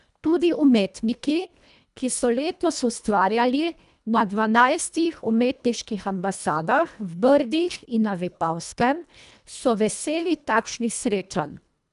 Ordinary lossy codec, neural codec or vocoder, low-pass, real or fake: none; codec, 24 kHz, 1.5 kbps, HILCodec; 10.8 kHz; fake